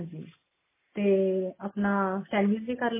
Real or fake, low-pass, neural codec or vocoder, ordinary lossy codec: real; 3.6 kHz; none; MP3, 16 kbps